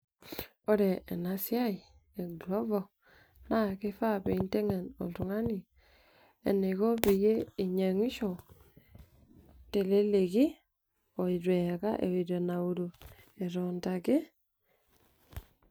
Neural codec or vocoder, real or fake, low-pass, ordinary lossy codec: none; real; none; none